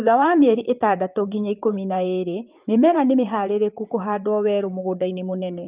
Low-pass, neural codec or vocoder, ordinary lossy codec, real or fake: 3.6 kHz; vocoder, 44.1 kHz, 128 mel bands, Pupu-Vocoder; Opus, 24 kbps; fake